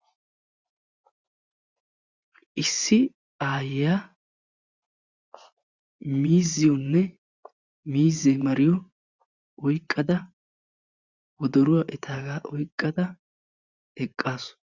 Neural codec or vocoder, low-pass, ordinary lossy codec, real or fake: vocoder, 24 kHz, 100 mel bands, Vocos; 7.2 kHz; Opus, 64 kbps; fake